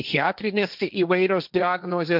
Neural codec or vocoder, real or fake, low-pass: codec, 16 kHz, 1.1 kbps, Voila-Tokenizer; fake; 5.4 kHz